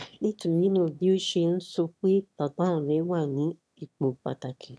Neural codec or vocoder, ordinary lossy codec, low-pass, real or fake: autoencoder, 22.05 kHz, a latent of 192 numbers a frame, VITS, trained on one speaker; none; none; fake